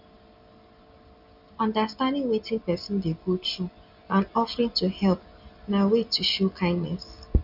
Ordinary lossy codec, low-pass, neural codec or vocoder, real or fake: none; 5.4 kHz; none; real